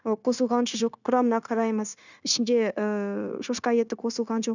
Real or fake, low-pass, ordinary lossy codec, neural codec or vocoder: fake; 7.2 kHz; none; codec, 16 kHz, 0.9 kbps, LongCat-Audio-Codec